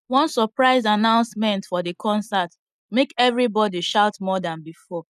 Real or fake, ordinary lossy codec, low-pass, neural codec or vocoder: real; none; 14.4 kHz; none